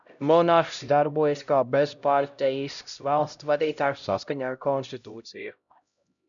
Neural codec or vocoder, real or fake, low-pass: codec, 16 kHz, 0.5 kbps, X-Codec, HuBERT features, trained on LibriSpeech; fake; 7.2 kHz